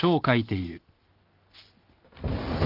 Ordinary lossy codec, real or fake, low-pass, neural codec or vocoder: Opus, 24 kbps; real; 5.4 kHz; none